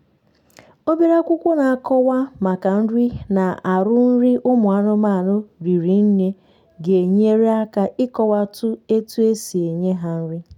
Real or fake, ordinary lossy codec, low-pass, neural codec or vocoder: real; none; 19.8 kHz; none